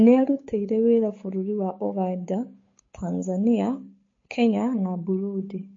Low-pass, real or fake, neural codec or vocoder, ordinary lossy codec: 7.2 kHz; fake; codec, 16 kHz, 8 kbps, FunCodec, trained on Chinese and English, 25 frames a second; MP3, 32 kbps